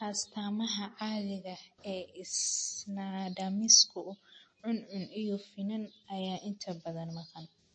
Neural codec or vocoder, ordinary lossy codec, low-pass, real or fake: none; MP3, 32 kbps; 9.9 kHz; real